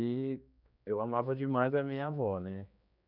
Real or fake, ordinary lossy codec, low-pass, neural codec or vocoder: fake; none; 5.4 kHz; codec, 16 kHz, 2 kbps, X-Codec, HuBERT features, trained on general audio